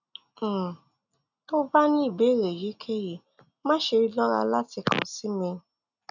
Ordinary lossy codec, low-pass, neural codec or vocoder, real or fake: none; 7.2 kHz; none; real